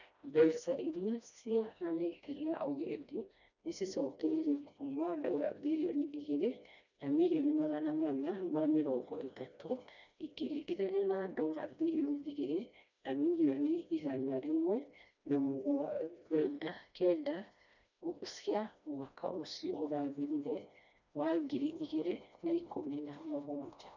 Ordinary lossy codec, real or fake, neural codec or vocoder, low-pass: none; fake; codec, 16 kHz, 1 kbps, FreqCodec, smaller model; 7.2 kHz